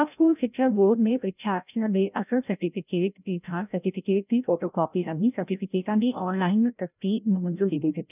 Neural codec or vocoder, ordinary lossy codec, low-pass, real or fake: codec, 16 kHz, 0.5 kbps, FreqCodec, larger model; none; 3.6 kHz; fake